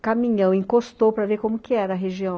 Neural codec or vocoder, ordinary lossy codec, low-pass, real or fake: none; none; none; real